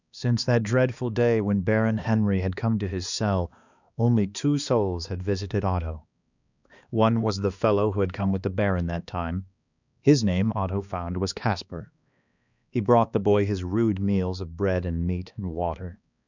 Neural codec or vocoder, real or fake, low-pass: codec, 16 kHz, 2 kbps, X-Codec, HuBERT features, trained on balanced general audio; fake; 7.2 kHz